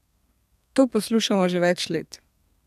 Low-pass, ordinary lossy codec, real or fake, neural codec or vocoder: 14.4 kHz; none; fake; codec, 32 kHz, 1.9 kbps, SNAC